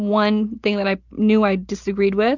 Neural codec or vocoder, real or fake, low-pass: none; real; 7.2 kHz